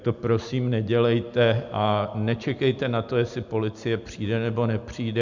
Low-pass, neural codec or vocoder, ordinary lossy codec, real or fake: 7.2 kHz; none; MP3, 64 kbps; real